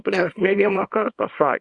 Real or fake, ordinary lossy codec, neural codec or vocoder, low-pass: fake; Opus, 64 kbps; codec, 24 kHz, 0.9 kbps, WavTokenizer, small release; 10.8 kHz